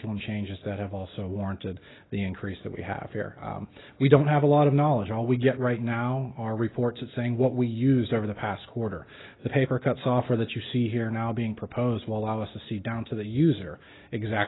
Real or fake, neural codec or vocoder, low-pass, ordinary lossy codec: real; none; 7.2 kHz; AAC, 16 kbps